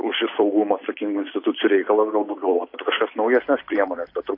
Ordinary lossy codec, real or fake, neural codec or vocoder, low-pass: AAC, 32 kbps; fake; vocoder, 44.1 kHz, 128 mel bands every 256 samples, BigVGAN v2; 5.4 kHz